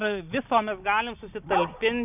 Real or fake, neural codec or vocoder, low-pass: real; none; 3.6 kHz